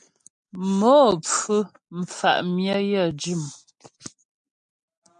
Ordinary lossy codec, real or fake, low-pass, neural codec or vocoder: MP3, 64 kbps; real; 9.9 kHz; none